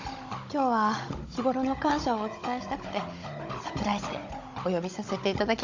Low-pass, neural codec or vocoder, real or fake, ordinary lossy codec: 7.2 kHz; codec, 16 kHz, 16 kbps, FunCodec, trained on Chinese and English, 50 frames a second; fake; MP3, 48 kbps